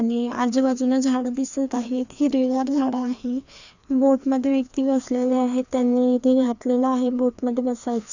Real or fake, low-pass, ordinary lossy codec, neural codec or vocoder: fake; 7.2 kHz; none; codec, 16 kHz, 2 kbps, FreqCodec, larger model